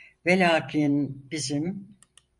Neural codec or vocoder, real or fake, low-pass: vocoder, 44.1 kHz, 128 mel bands every 512 samples, BigVGAN v2; fake; 10.8 kHz